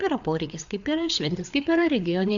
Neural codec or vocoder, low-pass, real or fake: codec, 16 kHz, 4 kbps, FreqCodec, larger model; 7.2 kHz; fake